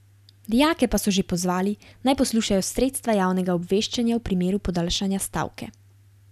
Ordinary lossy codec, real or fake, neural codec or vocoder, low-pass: none; real; none; 14.4 kHz